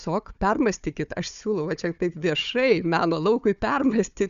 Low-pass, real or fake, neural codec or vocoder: 7.2 kHz; fake; codec, 16 kHz, 4 kbps, FunCodec, trained on Chinese and English, 50 frames a second